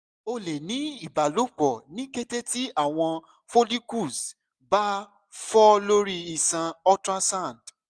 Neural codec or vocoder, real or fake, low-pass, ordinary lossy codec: none; real; none; none